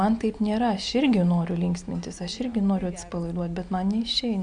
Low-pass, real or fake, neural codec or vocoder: 9.9 kHz; real; none